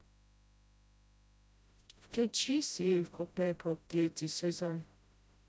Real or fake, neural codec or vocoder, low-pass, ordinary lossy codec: fake; codec, 16 kHz, 0.5 kbps, FreqCodec, smaller model; none; none